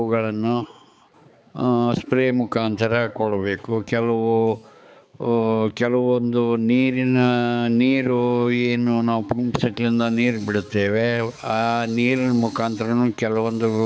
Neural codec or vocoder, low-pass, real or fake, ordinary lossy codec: codec, 16 kHz, 4 kbps, X-Codec, HuBERT features, trained on balanced general audio; none; fake; none